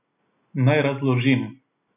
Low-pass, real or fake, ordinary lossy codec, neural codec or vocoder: 3.6 kHz; real; none; none